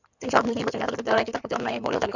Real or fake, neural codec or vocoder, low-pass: fake; codec, 16 kHz in and 24 kHz out, 2.2 kbps, FireRedTTS-2 codec; 7.2 kHz